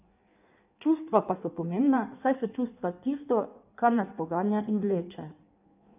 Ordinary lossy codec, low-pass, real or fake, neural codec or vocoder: none; 3.6 kHz; fake; codec, 16 kHz in and 24 kHz out, 1.1 kbps, FireRedTTS-2 codec